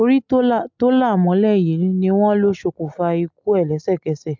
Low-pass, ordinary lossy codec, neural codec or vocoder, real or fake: 7.2 kHz; MP3, 64 kbps; none; real